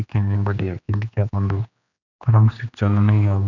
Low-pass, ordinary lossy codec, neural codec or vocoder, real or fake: 7.2 kHz; none; codec, 16 kHz, 2 kbps, X-Codec, HuBERT features, trained on general audio; fake